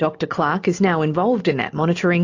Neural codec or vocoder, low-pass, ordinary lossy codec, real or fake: none; 7.2 kHz; AAC, 48 kbps; real